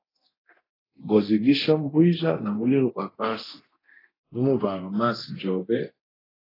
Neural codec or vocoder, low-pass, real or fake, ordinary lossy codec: codec, 24 kHz, 0.9 kbps, DualCodec; 5.4 kHz; fake; AAC, 24 kbps